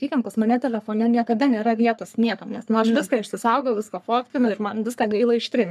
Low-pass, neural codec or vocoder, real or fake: 14.4 kHz; codec, 44.1 kHz, 3.4 kbps, Pupu-Codec; fake